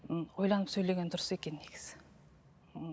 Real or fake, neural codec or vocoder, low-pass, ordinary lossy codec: real; none; none; none